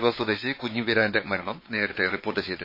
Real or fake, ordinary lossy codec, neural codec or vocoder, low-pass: fake; MP3, 24 kbps; codec, 16 kHz, 4 kbps, X-Codec, WavLM features, trained on Multilingual LibriSpeech; 5.4 kHz